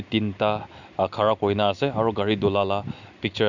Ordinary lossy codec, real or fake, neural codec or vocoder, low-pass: none; real; none; 7.2 kHz